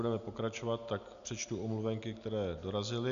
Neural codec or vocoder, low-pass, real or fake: none; 7.2 kHz; real